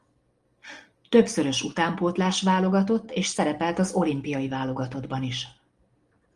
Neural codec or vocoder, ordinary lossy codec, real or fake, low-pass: none; Opus, 24 kbps; real; 10.8 kHz